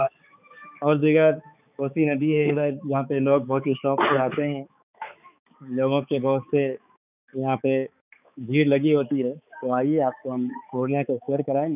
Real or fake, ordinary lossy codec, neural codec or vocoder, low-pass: fake; none; codec, 16 kHz, 4 kbps, X-Codec, HuBERT features, trained on balanced general audio; 3.6 kHz